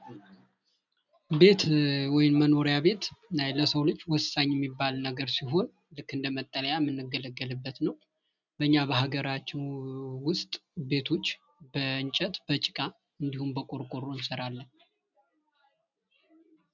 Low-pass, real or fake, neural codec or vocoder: 7.2 kHz; real; none